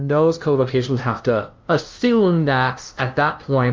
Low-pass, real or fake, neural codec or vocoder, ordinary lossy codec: 7.2 kHz; fake; codec, 16 kHz, 0.5 kbps, FunCodec, trained on LibriTTS, 25 frames a second; Opus, 32 kbps